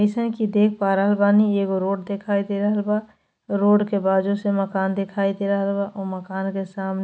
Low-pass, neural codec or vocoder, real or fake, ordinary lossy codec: none; none; real; none